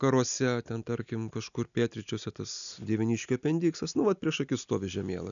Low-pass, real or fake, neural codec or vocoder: 7.2 kHz; real; none